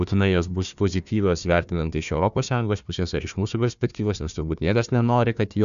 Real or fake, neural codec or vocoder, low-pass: fake; codec, 16 kHz, 1 kbps, FunCodec, trained on Chinese and English, 50 frames a second; 7.2 kHz